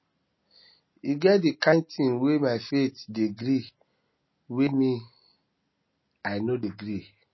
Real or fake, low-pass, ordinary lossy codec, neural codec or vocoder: real; 7.2 kHz; MP3, 24 kbps; none